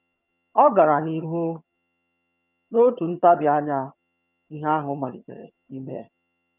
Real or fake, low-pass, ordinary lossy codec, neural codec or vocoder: fake; 3.6 kHz; none; vocoder, 22.05 kHz, 80 mel bands, HiFi-GAN